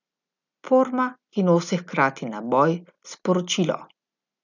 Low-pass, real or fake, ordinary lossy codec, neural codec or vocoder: 7.2 kHz; real; none; none